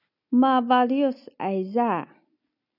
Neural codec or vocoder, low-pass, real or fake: none; 5.4 kHz; real